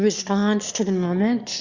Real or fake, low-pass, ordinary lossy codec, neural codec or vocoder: fake; 7.2 kHz; Opus, 64 kbps; autoencoder, 22.05 kHz, a latent of 192 numbers a frame, VITS, trained on one speaker